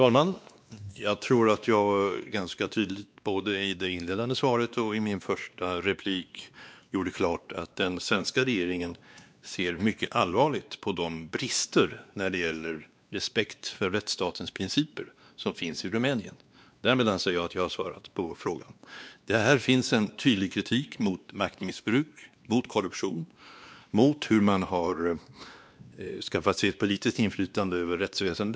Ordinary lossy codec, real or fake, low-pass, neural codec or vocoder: none; fake; none; codec, 16 kHz, 2 kbps, X-Codec, WavLM features, trained on Multilingual LibriSpeech